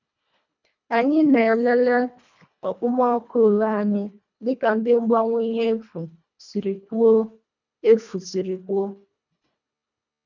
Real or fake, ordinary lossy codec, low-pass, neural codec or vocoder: fake; none; 7.2 kHz; codec, 24 kHz, 1.5 kbps, HILCodec